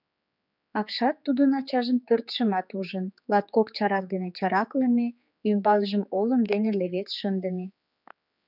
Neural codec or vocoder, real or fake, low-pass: codec, 16 kHz, 4 kbps, X-Codec, HuBERT features, trained on general audio; fake; 5.4 kHz